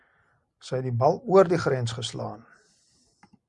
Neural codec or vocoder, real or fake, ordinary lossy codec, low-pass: none; real; Opus, 64 kbps; 10.8 kHz